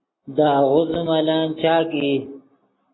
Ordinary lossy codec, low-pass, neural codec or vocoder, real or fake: AAC, 16 kbps; 7.2 kHz; none; real